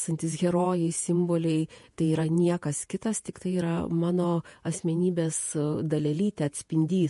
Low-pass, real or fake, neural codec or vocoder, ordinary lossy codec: 14.4 kHz; fake; vocoder, 48 kHz, 128 mel bands, Vocos; MP3, 48 kbps